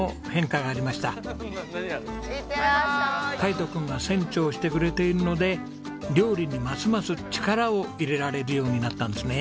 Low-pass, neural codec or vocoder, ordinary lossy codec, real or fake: none; none; none; real